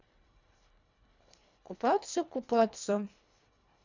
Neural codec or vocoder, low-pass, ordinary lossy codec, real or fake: codec, 24 kHz, 1.5 kbps, HILCodec; 7.2 kHz; none; fake